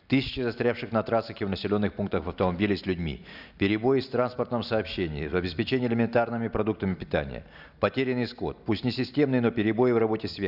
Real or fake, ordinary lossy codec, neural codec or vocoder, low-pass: real; none; none; 5.4 kHz